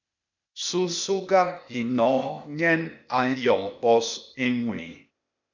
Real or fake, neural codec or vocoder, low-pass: fake; codec, 16 kHz, 0.8 kbps, ZipCodec; 7.2 kHz